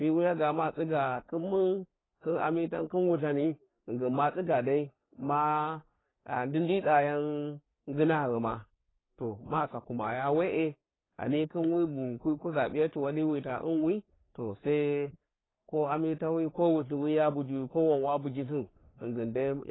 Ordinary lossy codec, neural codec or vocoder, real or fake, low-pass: AAC, 16 kbps; codec, 44.1 kHz, 3.4 kbps, Pupu-Codec; fake; 7.2 kHz